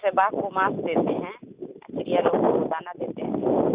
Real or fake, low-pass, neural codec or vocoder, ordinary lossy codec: real; 3.6 kHz; none; Opus, 64 kbps